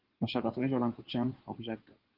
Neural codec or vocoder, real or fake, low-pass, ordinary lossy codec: codec, 16 kHz in and 24 kHz out, 2.2 kbps, FireRedTTS-2 codec; fake; 5.4 kHz; Opus, 16 kbps